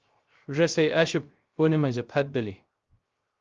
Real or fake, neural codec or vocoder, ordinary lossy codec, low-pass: fake; codec, 16 kHz, 0.3 kbps, FocalCodec; Opus, 32 kbps; 7.2 kHz